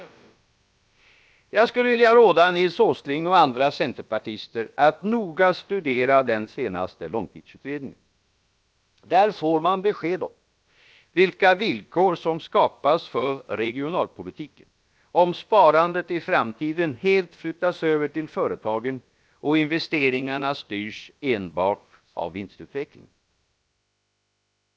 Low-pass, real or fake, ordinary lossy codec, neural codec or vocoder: none; fake; none; codec, 16 kHz, about 1 kbps, DyCAST, with the encoder's durations